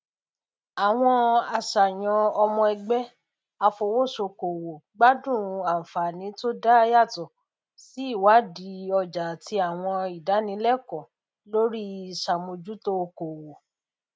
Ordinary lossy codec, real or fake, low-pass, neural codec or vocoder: none; real; none; none